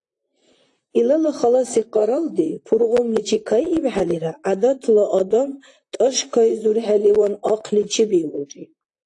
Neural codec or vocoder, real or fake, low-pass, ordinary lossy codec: vocoder, 44.1 kHz, 128 mel bands, Pupu-Vocoder; fake; 10.8 kHz; AAC, 48 kbps